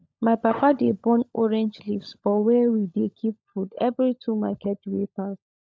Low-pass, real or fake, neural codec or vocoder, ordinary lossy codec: none; fake; codec, 16 kHz, 16 kbps, FunCodec, trained on LibriTTS, 50 frames a second; none